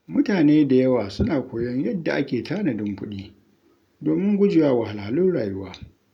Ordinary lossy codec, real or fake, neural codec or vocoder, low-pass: none; real; none; 19.8 kHz